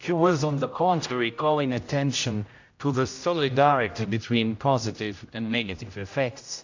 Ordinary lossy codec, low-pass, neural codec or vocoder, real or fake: AAC, 48 kbps; 7.2 kHz; codec, 16 kHz, 0.5 kbps, X-Codec, HuBERT features, trained on general audio; fake